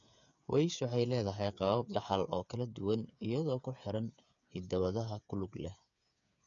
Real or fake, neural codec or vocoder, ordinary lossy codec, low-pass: fake; codec, 16 kHz, 8 kbps, FreqCodec, smaller model; none; 7.2 kHz